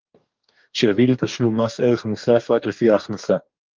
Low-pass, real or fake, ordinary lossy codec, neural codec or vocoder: 7.2 kHz; fake; Opus, 32 kbps; codec, 44.1 kHz, 2.6 kbps, DAC